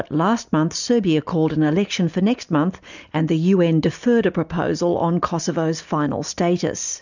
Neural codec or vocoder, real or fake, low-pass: none; real; 7.2 kHz